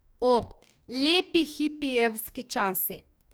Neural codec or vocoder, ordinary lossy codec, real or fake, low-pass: codec, 44.1 kHz, 2.6 kbps, DAC; none; fake; none